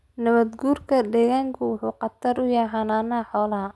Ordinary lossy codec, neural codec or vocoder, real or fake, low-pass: none; none; real; none